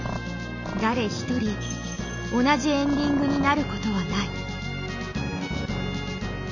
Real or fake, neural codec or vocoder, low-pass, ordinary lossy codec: real; none; 7.2 kHz; none